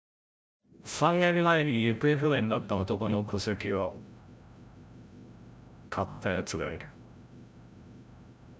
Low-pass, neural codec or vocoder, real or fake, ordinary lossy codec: none; codec, 16 kHz, 0.5 kbps, FreqCodec, larger model; fake; none